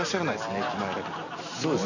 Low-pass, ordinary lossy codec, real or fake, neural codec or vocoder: 7.2 kHz; none; real; none